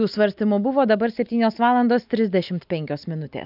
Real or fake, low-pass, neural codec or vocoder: real; 5.4 kHz; none